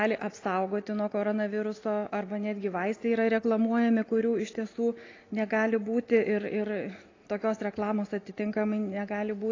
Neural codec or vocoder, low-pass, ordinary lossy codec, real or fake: none; 7.2 kHz; AAC, 32 kbps; real